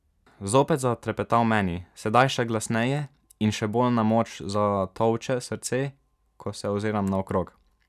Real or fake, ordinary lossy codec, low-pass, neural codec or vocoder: real; none; 14.4 kHz; none